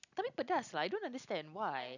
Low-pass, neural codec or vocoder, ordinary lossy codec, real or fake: 7.2 kHz; none; none; real